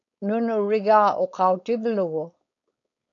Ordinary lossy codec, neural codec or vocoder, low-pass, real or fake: AAC, 48 kbps; codec, 16 kHz, 4.8 kbps, FACodec; 7.2 kHz; fake